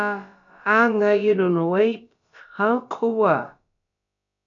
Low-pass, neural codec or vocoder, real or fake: 7.2 kHz; codec, 16 kHz, about 1 kbps, DyCAST, with the encoder's durations; fake